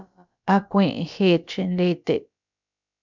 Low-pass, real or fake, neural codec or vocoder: 7.2 kHz; fake; codec, 16 kHz, about 1 kbps, DyCAST, with the encoder's durations